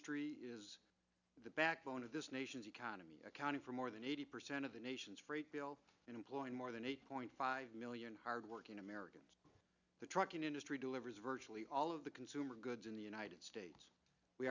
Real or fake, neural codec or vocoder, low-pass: real; none; 7.2 kHz